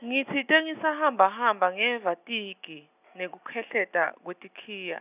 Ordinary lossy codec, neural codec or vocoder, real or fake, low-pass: none; none; real; 3.6 kHz